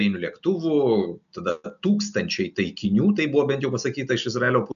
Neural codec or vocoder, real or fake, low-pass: none; real; 7.2 kHz